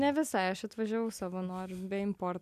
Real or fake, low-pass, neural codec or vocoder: real; 14.4 kHz; none